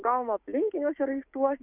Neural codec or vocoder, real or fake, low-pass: codec, 16 kHz, 6 kbps, DAC; fake; 3.6 kHz